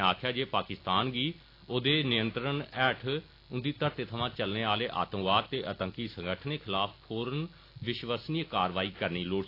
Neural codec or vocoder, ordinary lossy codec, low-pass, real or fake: none; AAC, 32 kbps; 5.4 kHz; real